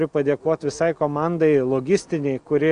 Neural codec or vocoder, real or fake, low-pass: none; real; 9.9 kHz